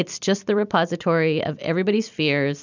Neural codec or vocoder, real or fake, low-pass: vocoder, 44.1 kHz, 128 mel bands every 256 samples, BigVGAN v2; fake; 7.2 kHz